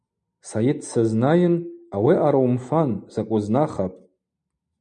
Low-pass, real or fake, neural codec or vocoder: 9.9 kHz; real; none